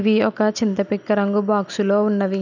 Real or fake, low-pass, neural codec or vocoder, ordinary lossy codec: real; 7.2 kHz; none; none